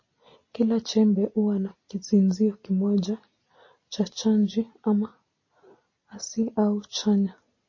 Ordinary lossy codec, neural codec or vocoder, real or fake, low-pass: MP3, 32 kbps; none; real; 7.2 kHz